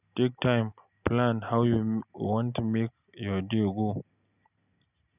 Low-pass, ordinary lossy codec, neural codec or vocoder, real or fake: 3.6 kHz; none; none; real